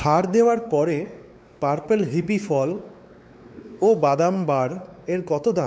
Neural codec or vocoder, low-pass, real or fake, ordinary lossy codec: codec, 16 kHz, 4 kbps, X-Codec, WavLM features, trained on Multilingual LibriSpeech; none; fake; none